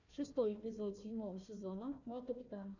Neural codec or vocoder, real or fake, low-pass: codec, 16 kHz, 1 kbps, FunCodec, trained on Chinese and English, 50 frames a second; fake; 7.2 kHz